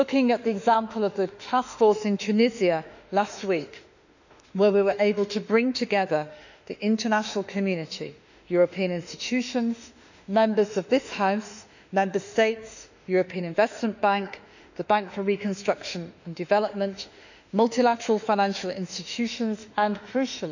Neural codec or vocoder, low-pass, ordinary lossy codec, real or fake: autoencoder, 48 kHz, 32 numbers a frame, DAC-VAE, trained on Japanese speech; 7.2 kHz; none; fake